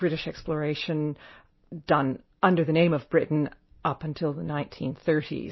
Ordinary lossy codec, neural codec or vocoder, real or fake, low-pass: MP3, 24 kbps; none; real; 7.2 kHz